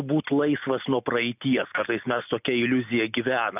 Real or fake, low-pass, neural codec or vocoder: real; 3.6 kHz; none